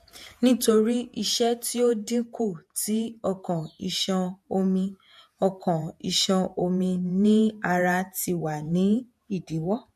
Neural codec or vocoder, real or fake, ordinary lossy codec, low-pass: vocoder, 48 kHz, 128 mel bands, Vocos; fake; MP3, 64 kbps; 14.4 kHz